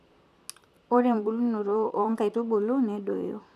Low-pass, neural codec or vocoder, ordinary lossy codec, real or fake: 14.4 kHz; vocoder, 44.1 kHz, 128 mel bands, Pupu-Vocoder; none; fake